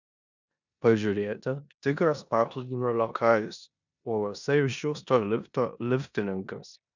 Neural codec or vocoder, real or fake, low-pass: codec, 16 kHz in and 24 kHz out, 0.9 kbps, LongCat-Audio-Codec, four codebook decoder; fake; 7.2 kHz